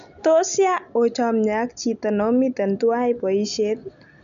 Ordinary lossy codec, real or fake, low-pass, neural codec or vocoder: none; real; 7.2 kHz; none